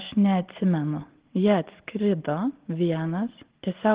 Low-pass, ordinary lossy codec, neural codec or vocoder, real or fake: 3.6 kHz; Opus, 16 kbps; none; real